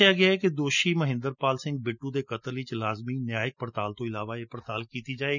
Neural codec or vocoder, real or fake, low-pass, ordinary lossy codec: none; real; 7.2 kHz; none